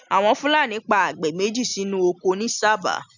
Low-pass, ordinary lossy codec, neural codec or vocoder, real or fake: 7.2 kHz; none; none; real